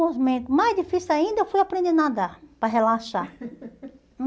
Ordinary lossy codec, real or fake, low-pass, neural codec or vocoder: none; real; none; none